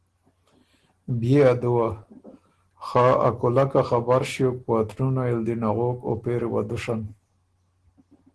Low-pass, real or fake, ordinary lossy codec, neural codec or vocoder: 10.8 kHz; real; Opus, 16 kbps; none